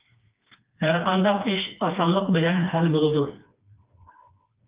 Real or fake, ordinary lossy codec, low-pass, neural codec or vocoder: fake; Opus, 24 kbps; 3.6 kHz; codec, 16 kHz, 2 kbps, FreqCodec, smaller model